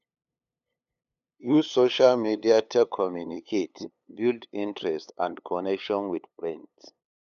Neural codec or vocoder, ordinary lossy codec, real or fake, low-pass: codec, 16 kHz, 8 kbps, FunCodec, trained on LibriTTS, 25 frames a second; none; fake; 7.2 kHz